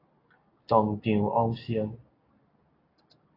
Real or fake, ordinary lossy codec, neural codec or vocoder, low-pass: real; AAC, 24 kbps; none; 5.4 kHz